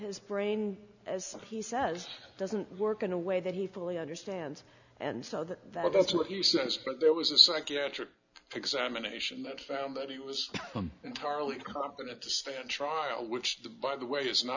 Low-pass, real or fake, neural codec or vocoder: 7.2 kHz; real; none